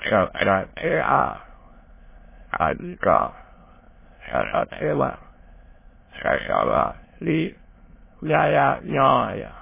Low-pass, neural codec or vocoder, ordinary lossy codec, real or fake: 3.6 kHz; autoencoder, 22.05 kHz, a latent of 192 numbers a frame, VITS, trained on many speakers; MP3, 16 kbps; fake